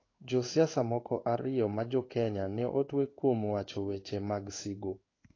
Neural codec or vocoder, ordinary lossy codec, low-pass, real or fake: codec, 16 kHz in and 24 kHz out, 1 kbps, XY-Tokenizer; AAC, 32 kbps; 7.2 kHz; fake